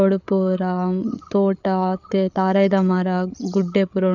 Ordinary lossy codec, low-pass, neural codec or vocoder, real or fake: none; 7.2 kHz; codec, 16 kHz, 8 kbps, FreqCodec, larger model; fake